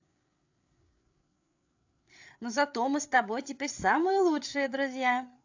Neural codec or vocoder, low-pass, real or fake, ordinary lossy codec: codec, 16 kHz, 8 kbps, FreqCodec, larger model; 7.2 kHz; fake; AAC, 48 kbps